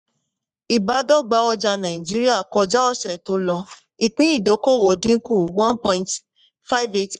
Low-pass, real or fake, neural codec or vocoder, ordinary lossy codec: 10.8 kHz; fake; codec, 44.1 kHz, 3.4 kbps, Pupu-Codec; none